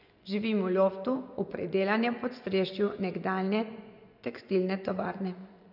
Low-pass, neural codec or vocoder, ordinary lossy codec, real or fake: 5.4 kHz; vocoder, 24 kHz, 100 mel bands, Vocos; none; fake